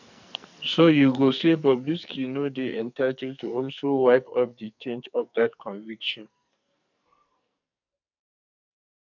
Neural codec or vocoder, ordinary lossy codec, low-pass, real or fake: codec, 44.1 kHz, 2.6 kbps, SNAC; none; 7.2 kHz; fake